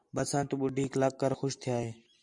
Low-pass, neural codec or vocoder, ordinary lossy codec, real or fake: 10.8 kHz; none; AAC, 64 kbps; real